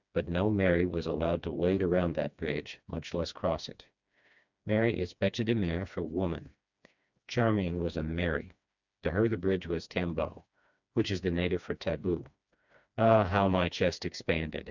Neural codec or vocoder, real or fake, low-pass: codec, 16 kHz, 2 kbps, FreqCodec, smaller model; fake; 7.2 kHz